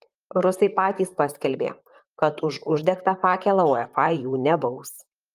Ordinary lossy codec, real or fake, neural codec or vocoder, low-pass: Opus, 32 kbps; fake; vocoder, 44.1 kHz, 128 mel bands, Pupu-Vocoder; 14.4 kHz